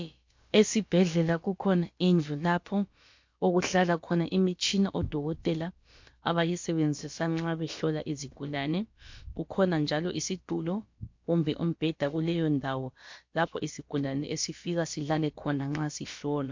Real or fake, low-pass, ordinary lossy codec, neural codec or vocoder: fake; 7.2 kHz; MP3, 48 kbps; codec, 16 kHz, about 1 kbps, DyCAST, with the encoder's durations